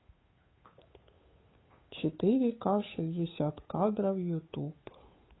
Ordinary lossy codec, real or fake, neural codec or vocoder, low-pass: AAC, 16 kbps; fake; codec, 16 kHz, 8 kbps, FunCodec, trained on Chinese and English, 25 frames a second; 7.2 kHz